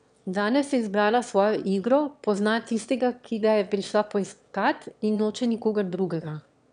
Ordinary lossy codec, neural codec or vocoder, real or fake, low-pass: none; autoencoder, 22.05 kHz, a latent of 192 numbers a frame, VITS, trained on one speaker; fake; 9.9 kHz